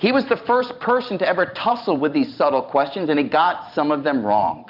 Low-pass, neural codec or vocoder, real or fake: 5.4 kHz; vocoder, 44.1 kHz, 128 mel bands every 512 samples, BigVGAN v2; fake